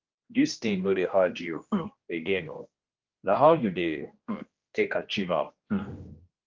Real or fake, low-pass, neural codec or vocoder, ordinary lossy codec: fake; 7.2 kHz; codec, 16 kHz, 1 kbps, X-Codec, HuBERT features, trained on general audio; Opus, 24 kbps